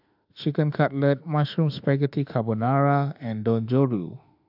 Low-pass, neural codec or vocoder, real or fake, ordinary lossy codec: 5.4 kHz; autoencoder, 48 kHz, 32 numbers a frame, DAC-VAE, trained on Japanese speech; fake; AAC, 48 kbps